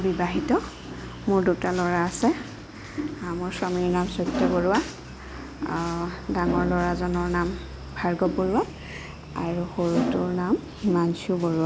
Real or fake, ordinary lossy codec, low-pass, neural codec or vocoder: real; none; none; none